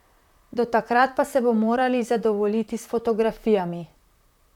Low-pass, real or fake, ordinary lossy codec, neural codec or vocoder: 19.8 kHz; fake; none; vocoder, 44.1 kHz, 128 mel bands, Pupu-Vocoder